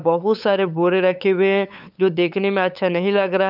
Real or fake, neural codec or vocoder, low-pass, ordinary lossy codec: fake; codec, 16 kHz, 8 kbps, FunCodec, trained on LibriTTS, 25 frames a second; 5.4 kHz; none